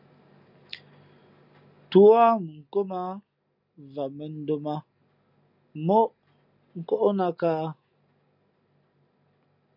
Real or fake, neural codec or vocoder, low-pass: real; none; 5.4 kHz